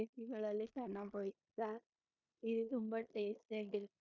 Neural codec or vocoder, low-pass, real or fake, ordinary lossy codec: codec, 16 kHz in and 24 kHz out, 0.9 kbps, LongCat-Audio-Codec, four codebook decoder; 7.2 kHz; fake; none